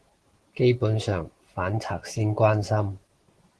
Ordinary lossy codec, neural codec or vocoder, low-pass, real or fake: Opus, 16 kbps; none; 10.8 kHz; real